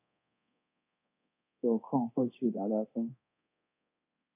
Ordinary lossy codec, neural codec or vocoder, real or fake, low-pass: AAC, 32 kbps; codec, 24 kHz, 0.9 kbps, DualCodec; fake; 3.6 kHz